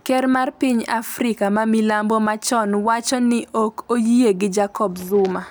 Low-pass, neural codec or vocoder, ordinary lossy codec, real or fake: none; none; none; real